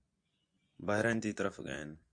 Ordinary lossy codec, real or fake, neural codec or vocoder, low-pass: AAC, 64 kbps; fake; vocoder, 24 kHz, 100 mel bands, Vocos; 9.9 kHz